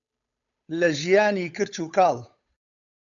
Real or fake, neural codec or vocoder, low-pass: fake; codec, 16 kHz, 8 kbps, FunCodec, trained on Chinese and English, 25 frames a second; 7.2 kHz